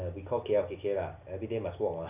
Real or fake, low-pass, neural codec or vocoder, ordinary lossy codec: real; 3.6 kHz; none; none